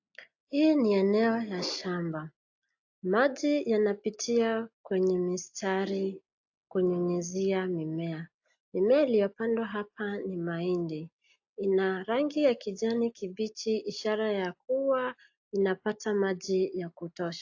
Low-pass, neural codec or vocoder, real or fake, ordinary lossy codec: 7.2 kHz; none; real; AAC, 48 kbps